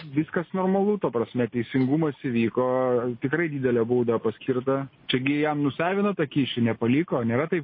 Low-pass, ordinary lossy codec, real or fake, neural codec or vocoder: 5.4 kHz; MP3, 24 kbps; real; none